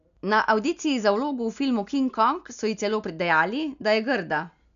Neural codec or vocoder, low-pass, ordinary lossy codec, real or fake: none; 7.2 kHz; none; real